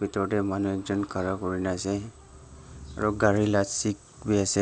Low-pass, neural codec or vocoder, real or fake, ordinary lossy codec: none; none; real; none